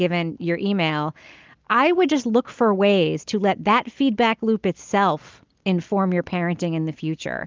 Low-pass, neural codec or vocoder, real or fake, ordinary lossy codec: 7.2 kHz; none; real; Opus, 32 kbps